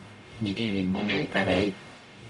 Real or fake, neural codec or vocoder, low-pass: fake; codec, 44.1 kHz, 0.9 kbps, DAC; 10.8 kHz